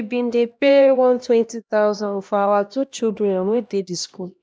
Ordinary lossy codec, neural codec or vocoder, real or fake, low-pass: none; codec, 16 kHz, 1 kbps, X-Codec, HuBERT features, trained on LibriSpeech; fake; none